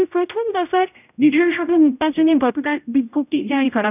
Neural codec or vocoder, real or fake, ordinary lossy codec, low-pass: codec, 16 kHz, 0.5 kbps, X-Codec, HuBERT features, trained on general audio; fake; none; 3.6 kHz